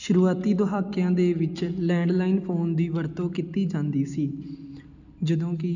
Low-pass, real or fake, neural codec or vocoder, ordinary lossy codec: 7.2 kHz; real; none; none